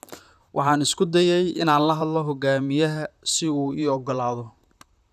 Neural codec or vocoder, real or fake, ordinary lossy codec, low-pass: vocoder, 44.1 kHz, 128 mel bands, Pupu-Vocoder; fake; none; 14.4 kHz